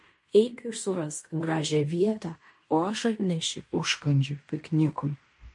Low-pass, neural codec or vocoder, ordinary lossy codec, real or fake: 10.8 kHz; codec, 16 kHz in and 24 kHz out, 0.9 kbps, LongCat-Audio-Codec, fine tuned four codebook decoder; MP3, 48 kbps; fake